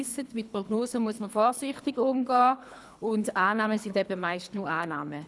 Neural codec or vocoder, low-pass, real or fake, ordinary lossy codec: codec, 24 kHz, 3 kbps, HILCodec; none; fake; none